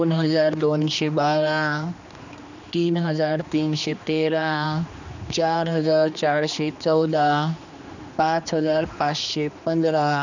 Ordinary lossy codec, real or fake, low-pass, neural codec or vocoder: none; fake; 7.2 kHz; codec, 16 kHz, 2 kbps, X-Codec, HuBERT features, trained on general audio